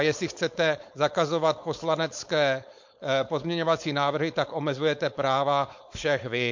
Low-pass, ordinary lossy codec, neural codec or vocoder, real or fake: 7.2 kHz; MP3, 48 kbps; codec, 16 kHz, 4.8 kbps, FACodec; fake